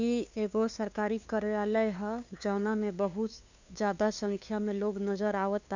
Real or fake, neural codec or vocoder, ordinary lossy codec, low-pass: fake; codec, 16 kHz, 2 kbps, FunCodec, trained on LibriTTS, 25 frames a second; none; 7.2 kHz